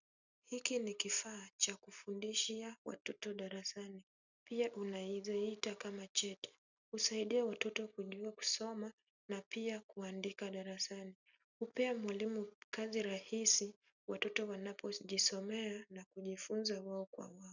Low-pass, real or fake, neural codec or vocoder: 7.2 kHz; real; none